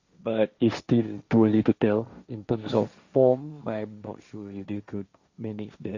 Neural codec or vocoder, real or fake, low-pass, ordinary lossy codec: codec, 16 kHz, 1.1 kbps, Voila-Tokenizer; fake; 7.2 kHz; Opus, 64 kbps